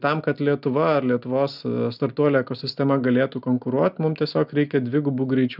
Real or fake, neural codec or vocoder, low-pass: real; none; 5.4 kHz